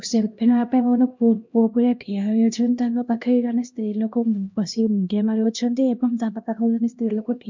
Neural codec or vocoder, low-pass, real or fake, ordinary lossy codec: codec, 16 kHz, 1 kbps, X-Codec, HuBERT features, trained on LibriSpeech; 7.2 kHz; fake; MP3, 48 kbps